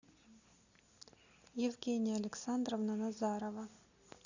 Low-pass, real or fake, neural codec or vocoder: 7.2 kHz; real; none